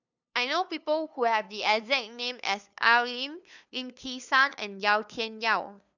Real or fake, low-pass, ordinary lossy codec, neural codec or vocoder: fake; 7.2 kHz; none; codec, 16 kHz, 2 kbps, FunCodec, trained on LibriTTS, 25 frames a second